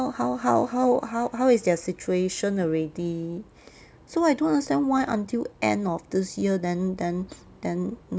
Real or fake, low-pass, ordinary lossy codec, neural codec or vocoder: real; none; none; none